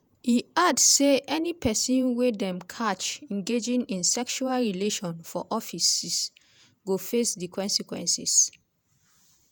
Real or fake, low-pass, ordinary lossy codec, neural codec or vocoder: fake; none; none; vocoder, 48 kHz, 128 mel bands, Vocos